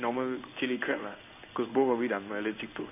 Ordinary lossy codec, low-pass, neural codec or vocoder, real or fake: none; 3.6 kHz; codec, 16 kHz in and 24 kHz out, 1 kbps, XY-Tokenizer; fake